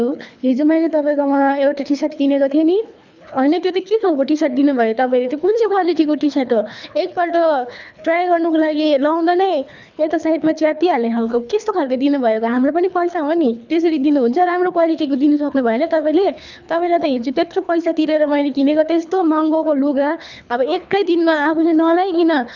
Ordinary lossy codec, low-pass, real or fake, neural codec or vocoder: none; 7.2 kHz; fake; codec, 24 kHz, 3 kbps, HILCodec